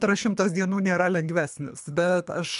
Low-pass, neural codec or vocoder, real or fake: 10.8 kHz; codec, 24 kHz, 3 kbps, HILCodec; fake